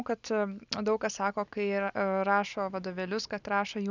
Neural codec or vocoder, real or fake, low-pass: none; real; 7.2 kHz